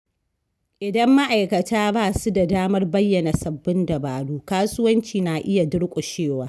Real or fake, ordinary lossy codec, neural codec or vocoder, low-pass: real; none; none; none